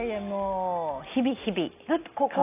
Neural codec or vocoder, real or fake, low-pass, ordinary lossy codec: none; real; 3.6 kHz; AAC, 24 kbps